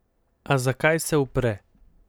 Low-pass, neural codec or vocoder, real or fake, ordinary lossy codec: none; none; real; none